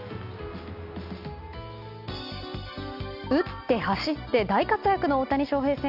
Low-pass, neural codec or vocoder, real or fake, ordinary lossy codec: 5.4 kHz; none; real; none